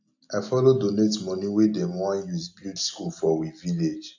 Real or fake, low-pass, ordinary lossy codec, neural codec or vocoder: real; 7.2 kHz; AAC, 48 kbps; none